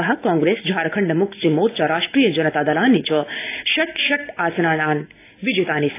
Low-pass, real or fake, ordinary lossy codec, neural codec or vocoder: 3.6 kHz; real; AAC, 24 kbps; none